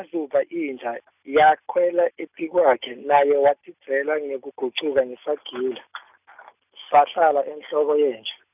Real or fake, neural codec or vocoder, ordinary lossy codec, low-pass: real; none; none; 3.6 kHz